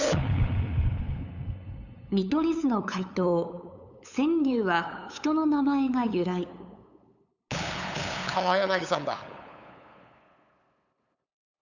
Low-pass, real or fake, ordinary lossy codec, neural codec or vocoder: 7.2 kHz; fake; none; codec, 16 kHz, 8 kbps, FunCodec, trained on LibriTTS, 25 frames a second